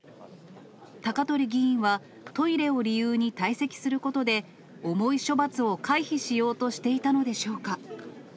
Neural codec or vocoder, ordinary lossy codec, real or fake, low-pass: none; none; real; none